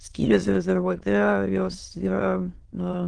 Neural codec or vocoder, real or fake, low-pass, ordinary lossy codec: autoencoder, 22.05 kHz, a latent of 192 numbers a frame, VITS, trained on many speakers; fake; 9.9 kHz; Opus, 16 kbps